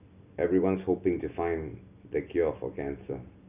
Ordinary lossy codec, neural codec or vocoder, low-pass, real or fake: none; none; 3.6 kHz; real